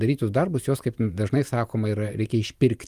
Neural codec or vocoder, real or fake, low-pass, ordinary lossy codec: none; real; 14.4 kHz; Opus, 32 kbps